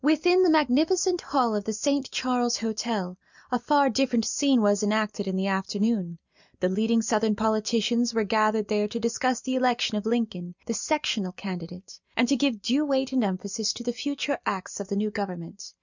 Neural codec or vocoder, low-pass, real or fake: none; 7.2 kHz; real